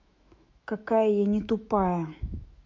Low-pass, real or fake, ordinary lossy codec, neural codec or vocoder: 7.2 kHz; fake; MP3, 64 kbps; autoencoder, 48 kHz, 128 numbers a frame, DAC-VAE, trained on Japanese speech